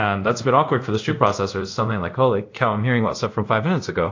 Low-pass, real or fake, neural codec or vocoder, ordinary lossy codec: 7.2 kHz; fake; codec, 24 kHz, 0.5 kbps, DualCodec; AAC, 48 kbps